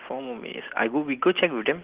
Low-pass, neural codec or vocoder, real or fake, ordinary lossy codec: 3.6 kHz; none; real; Opus, 16 kbps